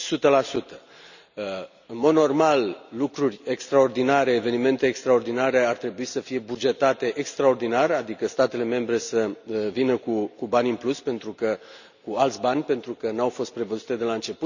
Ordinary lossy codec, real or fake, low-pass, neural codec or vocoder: none; real; 7.2 kHz; none